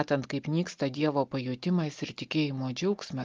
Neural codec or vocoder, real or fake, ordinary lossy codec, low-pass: none; real; Opus, 32 kbps; 7.2 kHz